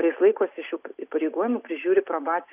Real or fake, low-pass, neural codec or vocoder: real; 3.6 kHz; none